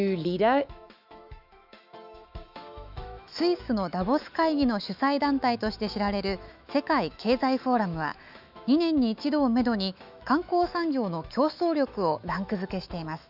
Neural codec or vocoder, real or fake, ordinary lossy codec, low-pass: autoencoder, 48 kHz, 128 numbers a frame, DAC-VAE, trained on Japanese speech; fake; none; 5.4 kHz